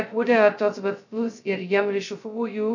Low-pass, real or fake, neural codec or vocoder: 7.2 kHz; fake; codec, 16 kHz, 0.2 kbps, FocalCodec